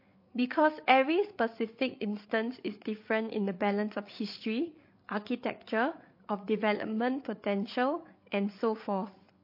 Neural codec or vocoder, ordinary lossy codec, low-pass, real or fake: codec, 16 kHz, 8 kbps, FreqCodec, larger model; MP3, 32 kbps; 5.4 kHz; fake